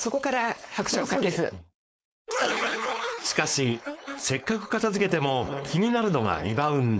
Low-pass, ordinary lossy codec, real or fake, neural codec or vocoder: none; none; fake; codec, 16 kHz, 4.8 kbps, FACodec